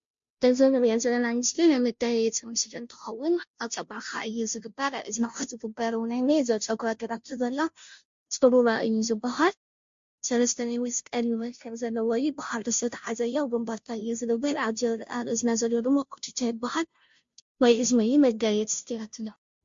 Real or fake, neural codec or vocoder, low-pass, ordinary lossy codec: fake; codec, 16 kHz, 0.5 kbps, FunCodec, trained on Chinese and English, 25 frames a second; 7.2 kHz; MP3, 48 kbps